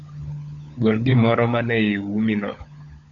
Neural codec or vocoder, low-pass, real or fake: codec, 16 kHz, 16 kbps, FunCodec, trained on LibriTTS, 50 frames a second; 7.2 kHz; fake